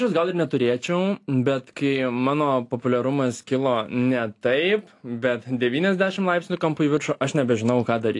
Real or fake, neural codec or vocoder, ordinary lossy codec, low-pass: real; none; AAC, 48 kbps; 10.8 kHz